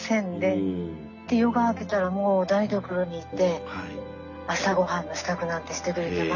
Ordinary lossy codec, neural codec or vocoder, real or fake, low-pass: none; none; real; 7.2 kHz